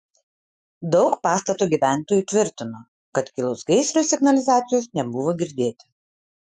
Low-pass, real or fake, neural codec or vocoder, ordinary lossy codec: 10.8 kHz; fake; autoencoder, 48 kHz, 128 numbers a frame, DAC-VAE, trained on Japanese speech; Opus, 64 kbps